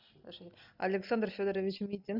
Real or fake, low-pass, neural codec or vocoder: real; 5.4 kHz; none